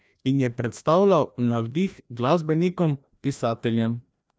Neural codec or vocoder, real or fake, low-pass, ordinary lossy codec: codec, 16 kHz, 1 kbps, FreqCodec, larger model; fake; none; none